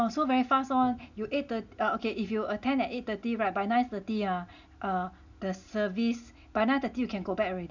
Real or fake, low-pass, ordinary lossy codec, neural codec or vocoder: real; 7.2 kHz; none; none